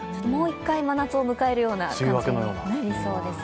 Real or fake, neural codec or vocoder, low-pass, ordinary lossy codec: real; none; none; none